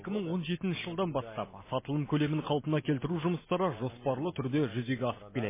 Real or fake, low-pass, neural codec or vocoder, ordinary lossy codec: real; 3.6 kHz; none; MP3, 16 kbps